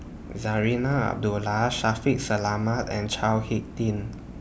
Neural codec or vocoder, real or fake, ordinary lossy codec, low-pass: none; real; none; none